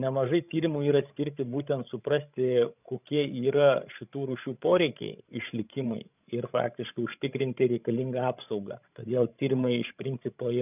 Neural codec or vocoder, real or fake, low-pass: codec, 16 kHz, 16 kbps, FreqCodec, larger model; fake; 3.6 kHz